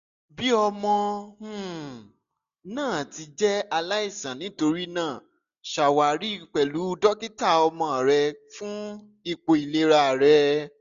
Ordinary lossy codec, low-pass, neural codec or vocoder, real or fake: none; 7.2 kHz; none; real